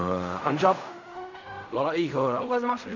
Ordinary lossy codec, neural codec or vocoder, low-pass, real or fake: none; codec, 16 kHz in and 24 kHz out, 0.4 kbps, LongCat-Audio-Codec, fine tuned four codebook decoder; 7.2 kHz; fake